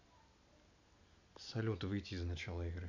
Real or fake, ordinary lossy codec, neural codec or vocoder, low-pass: real; none; none; 7.2 kHz